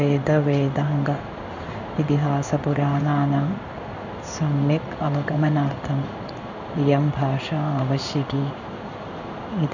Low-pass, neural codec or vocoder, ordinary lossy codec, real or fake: 7.2 kHz; codec, 16 kHz in and 24 kHz out, 1 kbps, XY-Tokenizer; none; fake